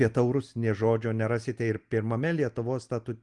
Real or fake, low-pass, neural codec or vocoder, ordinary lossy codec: real; 10.8 kHz; none; Opus, 24 kbps